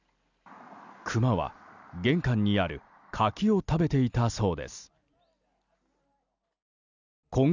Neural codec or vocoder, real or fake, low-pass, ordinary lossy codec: none; real; 7.2 kHz; none